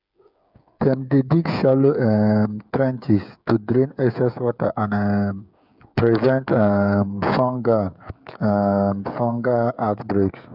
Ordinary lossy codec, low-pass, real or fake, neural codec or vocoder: none; 5.4 kHz; fake; codec, 16 kHz, 8 kbps, FreqCodec, smaller model